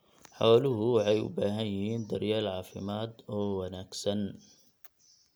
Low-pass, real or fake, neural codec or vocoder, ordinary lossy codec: none; real; none; none